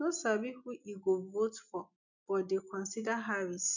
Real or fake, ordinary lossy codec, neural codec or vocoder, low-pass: real; none; none; 7.2 kHz